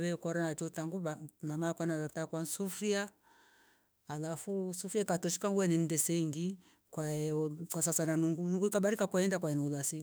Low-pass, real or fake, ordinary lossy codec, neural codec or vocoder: none; fake; none; autoencoder, 48 kHz, 32 numbers a frame, DAC-VAE, trained on Japanese speech